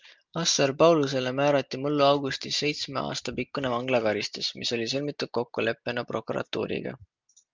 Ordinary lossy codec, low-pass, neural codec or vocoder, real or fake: Opus, 24 kbps; 7.2 kHz; none; real